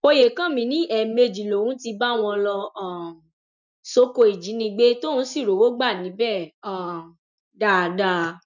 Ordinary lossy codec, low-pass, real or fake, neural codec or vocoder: none; 7.2 kHz; real; none